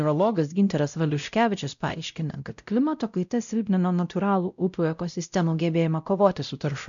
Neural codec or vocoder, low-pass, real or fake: codec, 16 kHz, 0.5 kbps, X-Codec, WavLM features, trained on Multilingual LibriSpeech; 7.2 kHz; fake